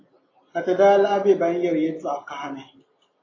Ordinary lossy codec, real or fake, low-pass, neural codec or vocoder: AAC, 32 kbps; real; 7.2 kHz; none